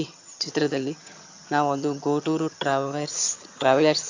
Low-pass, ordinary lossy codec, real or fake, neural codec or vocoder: 7.2 kHz; AAC, 48 kbps; fake; vocoder, 22.05 kHz, 80 mel bands, HiFi-GAN